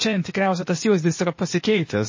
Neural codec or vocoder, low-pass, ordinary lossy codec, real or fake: codec, 16 kHz, 0.8 kbps, ZipCodec; 7.2 kHz; MP3, 32 kbps; fake